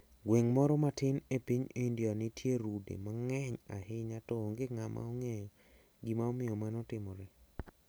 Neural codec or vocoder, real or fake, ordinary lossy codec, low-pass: none; real; none; none